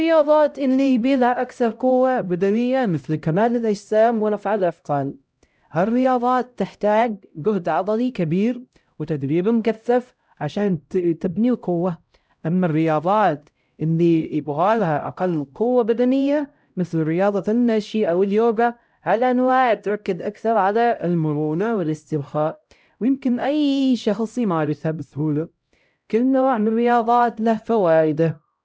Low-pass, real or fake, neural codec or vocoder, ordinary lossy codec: none; fake; codec, 16 kHz, 0.5 kbps, X-Codec, HuBERT features, trained on LibriSpeech; none